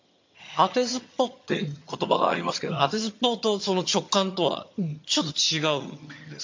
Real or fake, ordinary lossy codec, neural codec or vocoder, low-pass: fake; MP3, 48 kbps; vocoder, 22.05 kHz, 80 mel bands, HiFi-GAN; 7.2 kHz